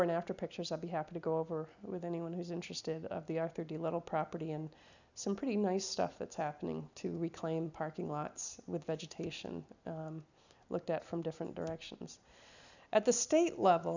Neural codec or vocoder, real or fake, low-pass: none; real; 7.2 kHz